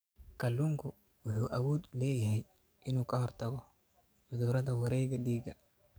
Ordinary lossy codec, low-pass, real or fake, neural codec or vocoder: none; none; fake; codec, 44.1 kHz, 7.8 kbps, DAC